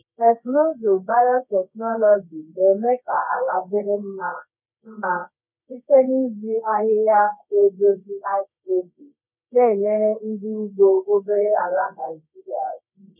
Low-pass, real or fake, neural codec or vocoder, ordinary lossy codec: 3.6 kHz; fake; codec, 24 kHz, 0.9 kbps, WavTokenizer, medium music audio release; none